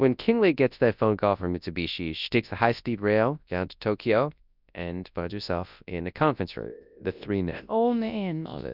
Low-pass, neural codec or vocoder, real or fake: 5.4 kHz; codec, 24 kHz, 0.9 kbps, WavTokenizer, large speech release; fake